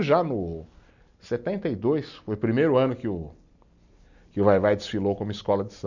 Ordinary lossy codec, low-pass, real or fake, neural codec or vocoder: none; 7.2 kHz; real; none